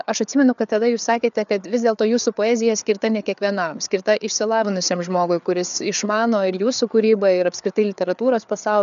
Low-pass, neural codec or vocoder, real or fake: 7.2 kHz; codec, 16 kHz, 4 kbps, FreqCodec, larger model; fake